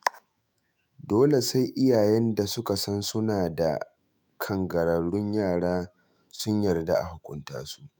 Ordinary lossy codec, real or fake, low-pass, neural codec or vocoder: none; fake; none; autoencoder, 48 kHz, 128 numbers a frame, DAC-VAE, trained on Japanese speech